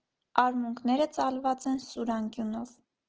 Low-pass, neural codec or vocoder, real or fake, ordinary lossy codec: 7.2 kHz; none; real; Opus, 16 kbps